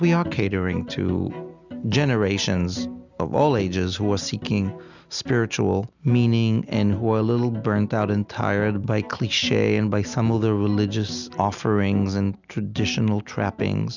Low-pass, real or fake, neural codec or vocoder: 7.2 kHz; real; none